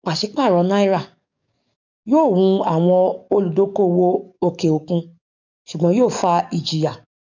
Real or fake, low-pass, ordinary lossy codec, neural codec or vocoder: fake; 7.2 kHz; none; codec, 44.1 kHz, 7.8 kbps, DAC